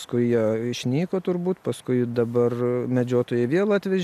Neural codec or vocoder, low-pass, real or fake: none; 14.4 kHz; real